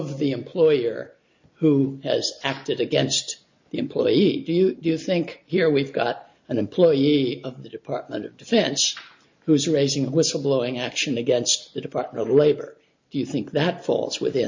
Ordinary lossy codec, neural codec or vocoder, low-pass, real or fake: MP3, 48 kbps; none; 7.2 kHz; real